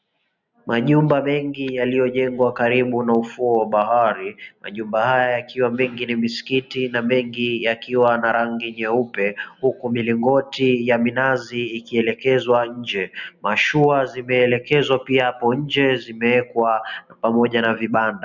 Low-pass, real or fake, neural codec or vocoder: 7.2 kHz; real; none